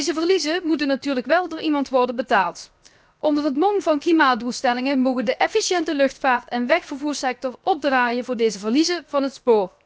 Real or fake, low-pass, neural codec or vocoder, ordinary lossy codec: fake; none; codec, 16 kHz, 0.7 kbps, FocalCodec; none